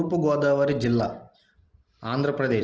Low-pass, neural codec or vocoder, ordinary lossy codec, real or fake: 7.2 kHz; none; Opus, 16 kbps; real